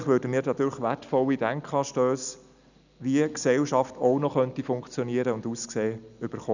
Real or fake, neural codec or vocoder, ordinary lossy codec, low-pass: real; none; none; 7.2 kHz